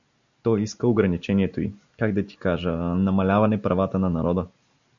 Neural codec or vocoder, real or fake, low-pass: none; real; 7.2 kHz